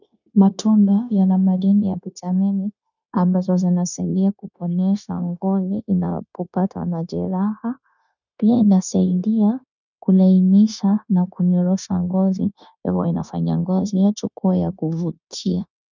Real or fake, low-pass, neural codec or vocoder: fake; 7.2 kHz; codec, 16 kHz, 0.9 kbps, LongCat-Audio-Codec